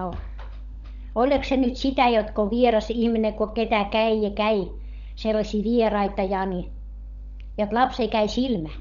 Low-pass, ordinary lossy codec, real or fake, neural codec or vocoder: 7.2 kHz; none; fake; codec, 16 kHz, 16 kbps, FunCodec, trained on LibriTTS, 50 frames a second